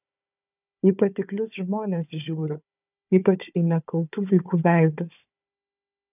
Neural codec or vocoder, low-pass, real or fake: codec, 16 kHz, 4 kbps, FunCodec, trained on Chinese and English, 50 frames a second; 3.6 kHz; fake